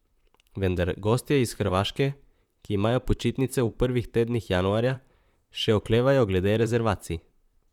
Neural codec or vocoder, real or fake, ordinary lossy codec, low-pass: vocoder, 44.1 kHz, 128 mel bands, Pupu-Vocoder; fake; none; 19.8 kHz